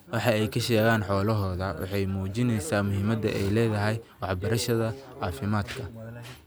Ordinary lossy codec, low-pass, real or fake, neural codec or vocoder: none; none; real; none